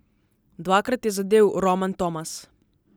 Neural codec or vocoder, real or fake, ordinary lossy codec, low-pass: none; real; none; none